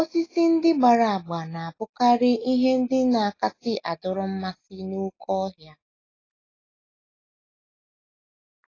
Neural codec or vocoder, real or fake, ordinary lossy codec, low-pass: none; real; AAC, 32 kbps; 7.2 kHz